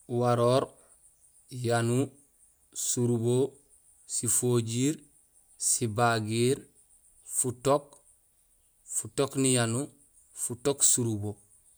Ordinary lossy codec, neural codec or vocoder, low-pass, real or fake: none; none; none; real